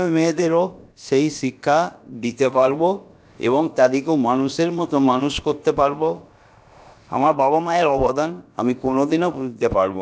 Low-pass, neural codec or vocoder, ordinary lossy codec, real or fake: none; codec, 16 kHz, about 1 kbps, DyCAST, with the encoder's durations; none; fake